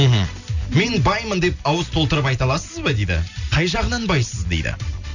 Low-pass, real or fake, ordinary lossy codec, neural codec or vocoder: 7.2 kHz; real; none; none